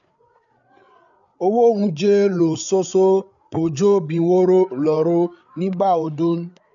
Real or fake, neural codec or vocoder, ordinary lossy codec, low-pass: fake; codec, 16 kHz, 8 kbps, FreqCodec, larger model; none; 7.2 kHz